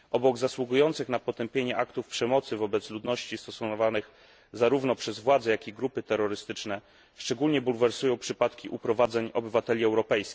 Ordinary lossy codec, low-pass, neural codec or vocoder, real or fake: none; none; none; real